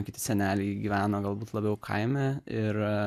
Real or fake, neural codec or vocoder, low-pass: real; none; 14.4 kHz